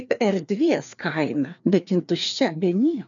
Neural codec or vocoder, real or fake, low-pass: codec, 16 kHz, 2 kbps, FreqCodec, larger model; fake; 7.2 kHz